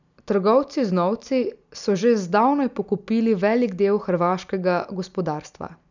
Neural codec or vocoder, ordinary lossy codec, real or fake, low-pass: none; none; real; 7.2 kHz